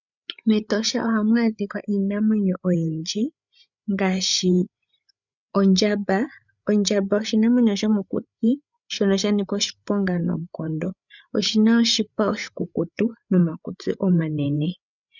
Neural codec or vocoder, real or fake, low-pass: codec, 16 kHz, 8 kbps, FreqCodec, larger model; fake; 7.2 kHz